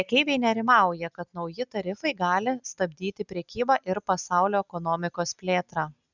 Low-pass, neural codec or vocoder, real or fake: 7.2 kHz; none; real